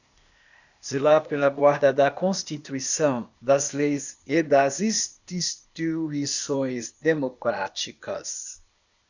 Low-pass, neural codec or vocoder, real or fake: 7.2 kHz; codec, 16 kHz, 0.8 kbps, ZipCodec; fake